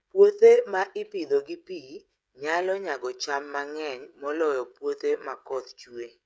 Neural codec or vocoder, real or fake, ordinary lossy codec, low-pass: codec, 16 kHz, 16 kbps, FreqCodec, smaller model; fake; none; none